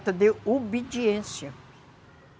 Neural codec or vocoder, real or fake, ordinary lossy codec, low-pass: none; real; none; none